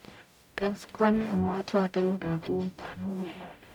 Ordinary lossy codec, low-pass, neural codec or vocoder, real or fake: none; 19.8 kHz; codec, 44.1 kHz, 0.9 kbps, DAC; fake